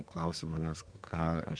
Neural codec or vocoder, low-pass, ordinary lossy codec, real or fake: codec, 24 kHz, 1 kbps, SNAC; 9.9 kHz; Opus, 64 kbps; fake